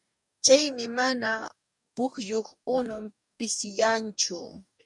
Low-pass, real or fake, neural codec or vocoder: 10.8 kHz; fake; codec, 44.1 kHz, 2.6 kbps, DAC